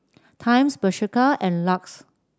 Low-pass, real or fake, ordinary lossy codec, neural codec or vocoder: none; real; none; none